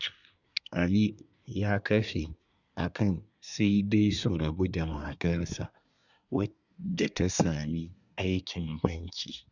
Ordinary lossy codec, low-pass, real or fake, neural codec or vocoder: none; 7.2 kHz; fake; codec, 24 kHz, 1 kbps, SNAC